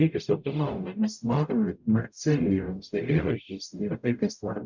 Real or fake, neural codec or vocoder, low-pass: fake; codec, 44.1 kHz, 0.9 kbps, DAC; 7.2 kHz